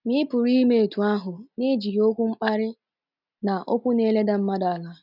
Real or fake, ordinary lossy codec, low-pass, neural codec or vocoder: real; none; 5.4 kHz; none